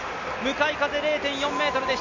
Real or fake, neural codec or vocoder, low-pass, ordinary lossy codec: real; none; 7.2 kHz; AAC, 48 kbps